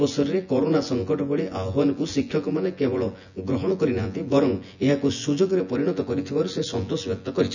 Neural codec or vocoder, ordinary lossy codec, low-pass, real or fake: vocoder, 24 kHz, 100 mel bands, Vocos; none; 7.2 kHz; fake